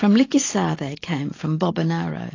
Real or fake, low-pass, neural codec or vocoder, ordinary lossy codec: real; 7.2 kHz; none; AAC, 32 kbps